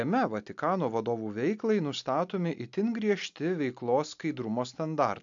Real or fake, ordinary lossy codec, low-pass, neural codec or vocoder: real; AAC, 64 kbps; 7.2 kHz; none